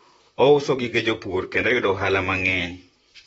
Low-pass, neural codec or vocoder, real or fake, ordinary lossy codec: 19.8 kHz; vocoder, 44.1 kHz, 128 mel bands, Pupu-Vocoder; fake; AAC, 24 kbps